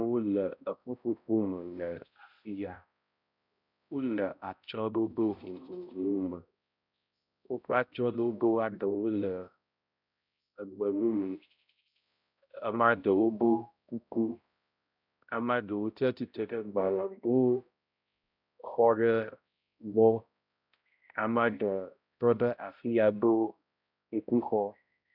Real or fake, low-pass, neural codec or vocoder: fake; 5.4 kHz; codec, 16 kHz, 0.5 kbps, X-Codec, HuBERT features, trained on balanced general audio